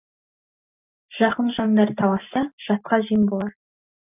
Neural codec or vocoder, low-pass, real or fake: none; 3.6 kHz; real